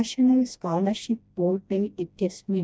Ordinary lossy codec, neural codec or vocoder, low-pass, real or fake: none; codec, 16 kHz, 1 kbps, FreqCodec, smaller model; none; fake